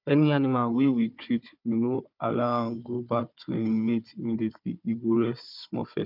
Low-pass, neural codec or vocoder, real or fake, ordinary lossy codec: 5.4 kHz; codec, 16 kHz, 4 kbps, FunCodec, trained on Chinese and English, 50 frames a second; fake; none